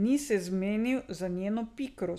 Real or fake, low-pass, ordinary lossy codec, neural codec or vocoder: real; 14.4 kHz; none; none